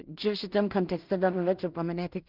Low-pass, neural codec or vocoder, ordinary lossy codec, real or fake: 5.4 kHz; codec, 16 kHz in and 24 kHz out, 0.4 kbps, LongCat-Audio-Codec, two codebook decoder; Opus, 32 kbps; fake